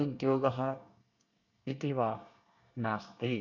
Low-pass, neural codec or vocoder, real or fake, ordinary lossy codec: 7.2 kHz; codec, 24 kHz, 1 kbps, SNAC; fake; AAC, 48 kbps